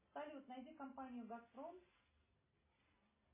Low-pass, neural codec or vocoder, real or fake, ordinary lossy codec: 3.6 kHz; none; real; MP3, 16 kbps